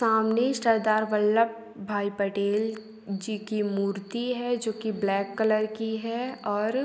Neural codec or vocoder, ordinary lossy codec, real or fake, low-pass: none; none; real; none